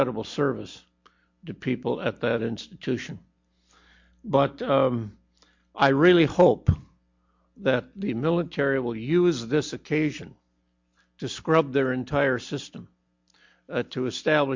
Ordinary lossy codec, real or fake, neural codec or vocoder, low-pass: MP3, 48 kbps; real; none; 7.2 kHz